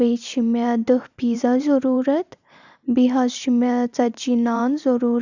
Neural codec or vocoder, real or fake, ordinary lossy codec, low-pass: vocoder, 44.1 kHz, 128 mel bands every 512 samples, BigVGAN v2; fake; none; 7.2 kHz